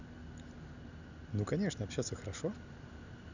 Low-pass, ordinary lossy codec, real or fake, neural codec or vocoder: 7.2 kHz; none; real; none